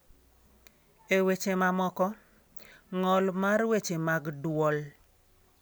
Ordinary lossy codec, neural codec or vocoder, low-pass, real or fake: none; none; none; real